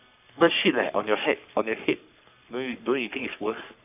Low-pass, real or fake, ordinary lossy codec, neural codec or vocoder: 3.6 kHz; fake; AAC, 32 kbps; codec, 44.1 kHz, 3.4 kbps, Pupu-Codec